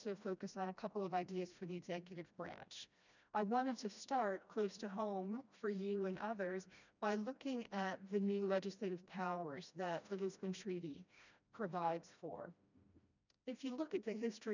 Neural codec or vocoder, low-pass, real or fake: codec, 16 kHz, 1 kbps, FreqCodec, smaller model; 7.2 kHz; fake